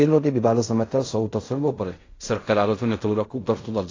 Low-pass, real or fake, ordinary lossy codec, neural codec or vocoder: 7.2 kHz; fake; AAC, 32 kbps; codec, 16 kHz in and 24 kHz out, 0.4 kbps, LongCat-Audio-Codec, fine tuned four codebook decoder